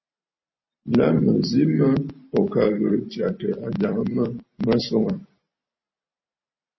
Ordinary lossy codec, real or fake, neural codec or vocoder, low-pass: MP3, 24 kbps; fake; vocoder, 44.1 kHz, 128 mel bands every 256 samples, BigVGAN v2; 7.2 kHz